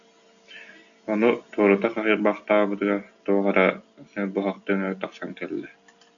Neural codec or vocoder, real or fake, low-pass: none; real; 7.2 kHz